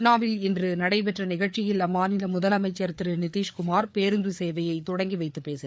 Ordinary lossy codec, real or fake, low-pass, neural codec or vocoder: none; fake; none; codec, 16 kHz, 4 kbps, FreqCodec, larger model